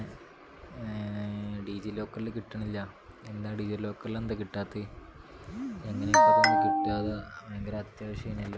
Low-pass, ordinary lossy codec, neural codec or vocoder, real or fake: none; none; none; real